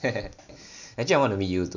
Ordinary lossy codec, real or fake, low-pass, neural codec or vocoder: none; real; 7.2 kHz; none